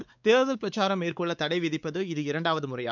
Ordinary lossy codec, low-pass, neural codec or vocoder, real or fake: none; 7.2 kHz; codec, 16 kHz, 4 kbps, X-Codec, WavLM features, trained on Multilingual LibriSpeech; fake